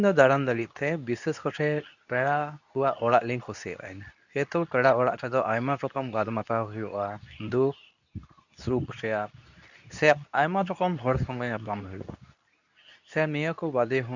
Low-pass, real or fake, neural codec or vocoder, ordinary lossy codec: 7.2 kHz; fake; codec, 24 kHz, 0.9 kbps, WavTokenizer, medium speech release version 2; none